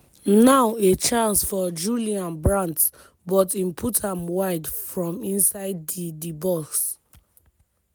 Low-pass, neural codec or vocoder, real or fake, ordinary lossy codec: none; none; real; none